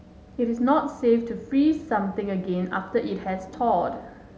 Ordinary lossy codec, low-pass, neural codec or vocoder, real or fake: none; none; none; real